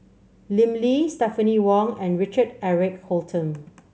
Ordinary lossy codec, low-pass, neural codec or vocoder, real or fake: none; none; none; real